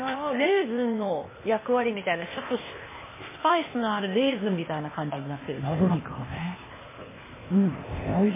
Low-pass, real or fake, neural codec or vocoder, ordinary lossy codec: 3.6 kHz; fake; codec, 16 kHz, 0.8 kbps, ZipCodec; MP3, 16 kbps